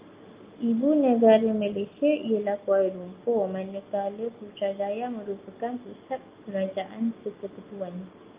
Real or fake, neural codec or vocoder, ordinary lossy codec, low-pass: real; none; Opus, 24 kbps; 3.6 kHz